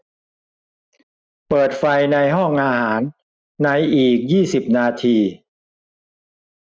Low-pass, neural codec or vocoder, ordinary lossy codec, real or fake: none; none; none; real